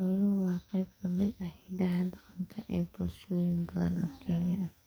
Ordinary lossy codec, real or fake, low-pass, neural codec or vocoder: none; fake; none; codec, 44.1 kHz, 2.6 kbps, SNAC